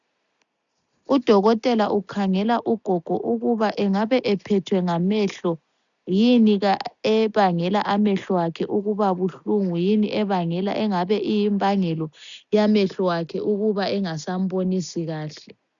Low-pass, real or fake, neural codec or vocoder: 7.2 kHz; real; none